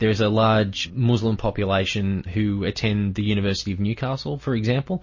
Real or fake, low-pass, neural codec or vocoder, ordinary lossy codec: real; 7.2 kHz; none; MP3, 32 kbps